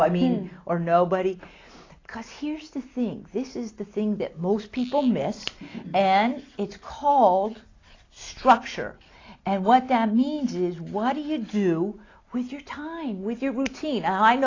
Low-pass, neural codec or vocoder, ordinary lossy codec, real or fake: 7.2 kHz; none; AAC, 32 kbps; real